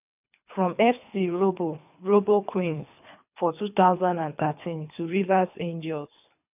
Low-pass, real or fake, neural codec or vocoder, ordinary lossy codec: 3.6 kHz; fake; codec, 24 kHz, 3 kbps, HILCodec; none